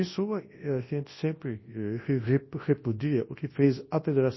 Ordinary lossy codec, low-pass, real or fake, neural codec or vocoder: MP3, 24 kbps; 7.2 kHz; fake; codec, 24 kHz, 0.9 kbps, WavTokenizer, large speech release